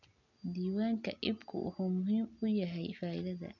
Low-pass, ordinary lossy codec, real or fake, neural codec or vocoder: 7.2 kHz; none; real; none